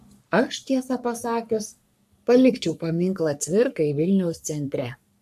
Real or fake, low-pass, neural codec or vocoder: fake; 14.4 kHz; codec, 44.1 kHz, 3.4 kbps, Pupu-Codec